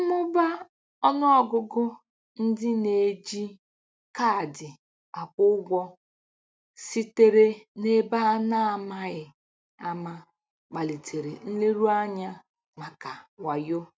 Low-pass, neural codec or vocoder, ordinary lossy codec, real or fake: none; none; none; real